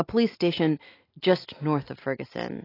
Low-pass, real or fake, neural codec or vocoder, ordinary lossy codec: 5.4 kHz; real; none; AAC, 24 kbps